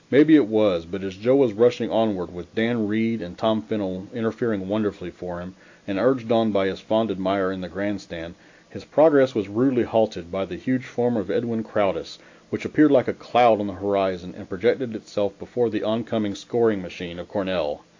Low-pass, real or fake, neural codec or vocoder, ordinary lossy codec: 7.2 kHz; real; none; AAC, 48 kbps